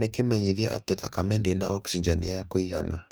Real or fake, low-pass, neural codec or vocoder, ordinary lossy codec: fake; none; codec, 44.1 kHz, 2.6 kbps, DAC; none